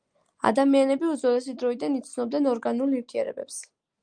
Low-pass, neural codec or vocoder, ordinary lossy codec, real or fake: 9.9 kHz; none; Opus, 32 kbps; real